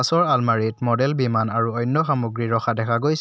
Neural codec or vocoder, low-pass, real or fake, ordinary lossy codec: none; none; real; none